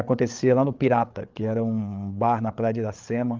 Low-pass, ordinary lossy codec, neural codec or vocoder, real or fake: 7.2 kHz; Opus, 24 kbps; codec, 16 kHz, 8 kbps, FreqCodec, larger model; fake